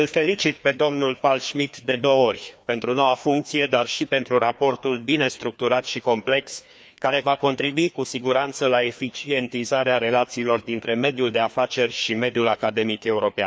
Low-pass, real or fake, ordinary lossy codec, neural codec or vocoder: none; fake; none; codec, 16 kHz, 2 kbps, FreqCodec, larger model